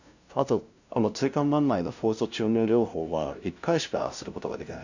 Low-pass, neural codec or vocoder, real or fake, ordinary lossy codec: 7.2 kHz; codec, 16 kHz, 0.5 kbps, FunCodec, trained on LibriTTS, 25 frames a second; fake; none